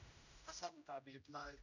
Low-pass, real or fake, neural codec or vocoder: 7.2 kHz; fake; codec, 16 kHz, 0.5 kbps, X-Codec, HuBERT features, trained on general audio